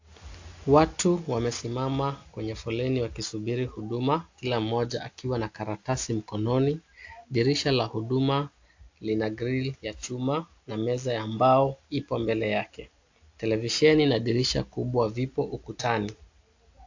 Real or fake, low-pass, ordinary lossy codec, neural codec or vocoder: real; 7.2 kHz; AAC, 48 kbps; none